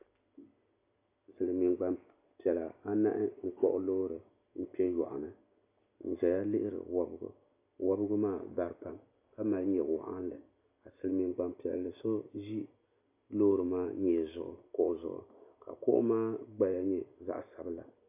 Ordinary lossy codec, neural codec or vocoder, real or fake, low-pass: AAC, 32 kbps; none; real; 3.6 kHz